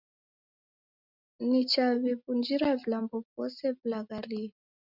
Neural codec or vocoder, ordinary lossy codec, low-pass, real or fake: none; Opus, 64 kbps; 5.4 kHz; real